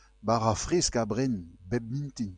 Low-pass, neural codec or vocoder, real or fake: 9.9 kHz; none; real